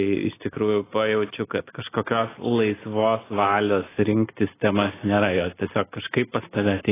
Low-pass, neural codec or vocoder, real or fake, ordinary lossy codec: 3.6 kHz; none; real; AAC, 16 kbps